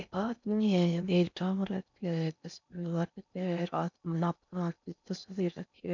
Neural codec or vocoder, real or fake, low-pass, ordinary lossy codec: codec, 16 kHz in and 24 kHz out, 0.6 kbps, FocalCodec, streaming, 4096 codes; fake; 7.2 kHz; none